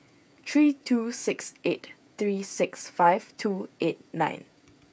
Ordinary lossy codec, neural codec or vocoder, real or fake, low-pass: none; codec, 16 kHz, 16 kbps, FreqCodec, smaller model; fake; none